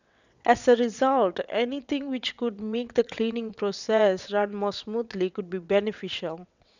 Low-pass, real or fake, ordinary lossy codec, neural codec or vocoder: 7.2 kHz; fake; none; vocoder, 22.05 kHz, 80 mel bands, WaveNeXt